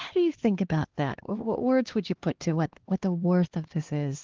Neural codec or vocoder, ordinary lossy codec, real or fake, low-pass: codec, 16 kHz, 2 kbps, X-Codec, HuBERT features, trained on LibriSpeech; Opus, 16 kbps; fake; 7.2 kHz